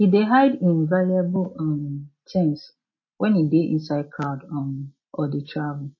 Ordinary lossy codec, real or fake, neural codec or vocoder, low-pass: MP3, 32 kbps; real; none; 7.2 kHz